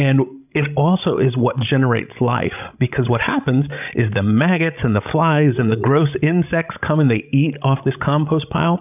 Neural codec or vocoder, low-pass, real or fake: codec, 16 kHz, 16 kbps, FreqCodec, larger model; 3.6 kHz; fake